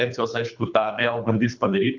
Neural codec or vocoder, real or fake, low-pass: codec, 24 kHz, 3 kbps, HILCodec; fake; 7.2 kHz